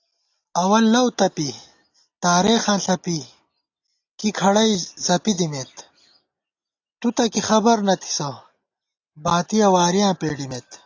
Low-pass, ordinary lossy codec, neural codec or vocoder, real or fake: 7.2 kHz; AAC, 48 kbps; none; real